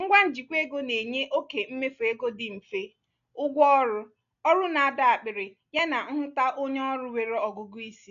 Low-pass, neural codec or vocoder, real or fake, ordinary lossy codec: 7.2 kHz; none; real; none